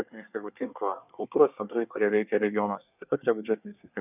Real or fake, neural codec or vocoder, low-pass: fake; codec, 24 kHz, 1 kbps, SNAC; 3.6 kHz